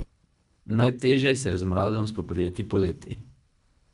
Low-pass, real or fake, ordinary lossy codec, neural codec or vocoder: 10.8 kHz; fake; none; codec, 24 kHz, 1.5 kbps, HILCodec